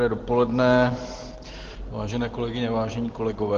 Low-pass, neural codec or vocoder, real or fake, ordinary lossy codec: 7.2 kHz; none; real; Opus, 16 kbps